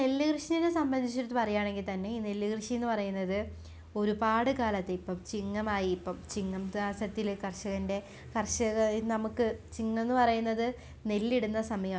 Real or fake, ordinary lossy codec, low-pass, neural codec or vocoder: real; none; none; none